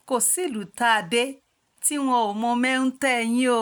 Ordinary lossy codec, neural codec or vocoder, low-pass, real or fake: none; none; none; real